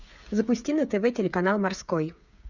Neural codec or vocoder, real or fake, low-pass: codec, 16 kHz, 8 kbps, FreqCodec, smaller model; fake; 7.2 kHz